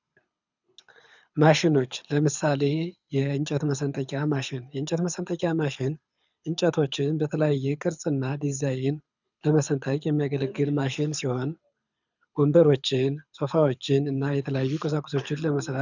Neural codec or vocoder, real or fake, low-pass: codec, 24 kHz, 6 kbps, HILCodec; fake; 7.2 kHz